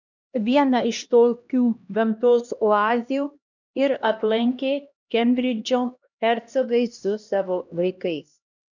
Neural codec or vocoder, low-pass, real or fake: codec, 16 kHz, 1 kbps, X-Codec, HuBERT features, trained on LibriSpeech; 7.2 kHz; fake